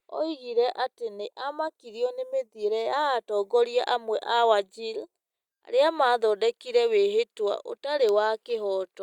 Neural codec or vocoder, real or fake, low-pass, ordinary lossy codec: none; real; 19.8 kHz; Opus, 64 kbps